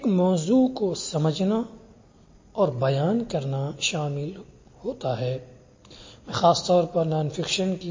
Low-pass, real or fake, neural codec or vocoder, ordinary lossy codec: 7.2 kHz; real; none; MP3, 32 kbps